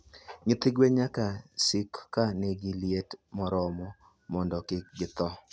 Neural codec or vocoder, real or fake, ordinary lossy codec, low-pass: none; real; none; none